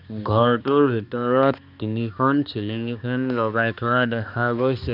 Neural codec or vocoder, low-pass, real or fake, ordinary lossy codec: codec, 16 kHz, 2 kbps, X-Codec, HuBERT features, trained on balanced general audio; 5.4 kHz; fake; none